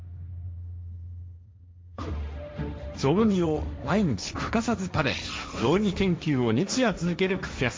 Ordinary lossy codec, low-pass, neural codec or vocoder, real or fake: none; none; codec, 16 kHz, 1.1 kbps, Voila-Tokenizer; fake